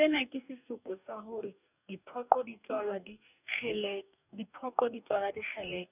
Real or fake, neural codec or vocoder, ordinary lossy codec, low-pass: fake; codec, 44.1 kHz, 2.6 kbps, DAC; none; 3.6 kHz